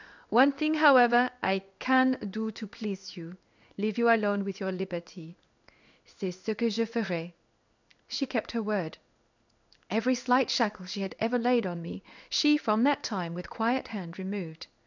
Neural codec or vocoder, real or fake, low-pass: codec, 16 kHz in and 24 kHz out, 1 kbps, XY-Tokenizer; fake; 7.2 kHz